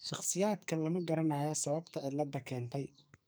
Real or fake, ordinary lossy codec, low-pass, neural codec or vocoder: fake; none; none; codec, 44.1 kHz, 2.6 kbps, SNAC